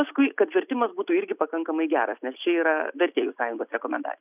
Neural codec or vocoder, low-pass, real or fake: none; 3.6 kHz; real